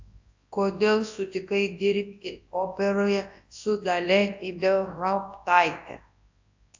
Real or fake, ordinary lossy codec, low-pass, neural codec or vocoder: fake; AAC, 48 kbps; 7.2 kHz; codec, 24 kHz, 0.9 kbps, WavTokenizer, large speech release